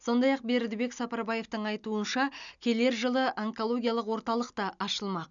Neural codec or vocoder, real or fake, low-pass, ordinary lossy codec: none; real; 7.2 kHz; none